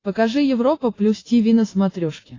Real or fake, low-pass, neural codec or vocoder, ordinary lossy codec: real; 7.2 kHz; none; AAC, 32 kbps